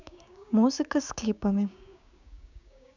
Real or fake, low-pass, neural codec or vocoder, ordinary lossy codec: fake; 7.2 kHz; codec, 16 kHz, 6 kbps, DAC; none